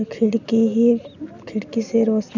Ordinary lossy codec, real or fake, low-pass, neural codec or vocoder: none; real; 7.2 kHz; none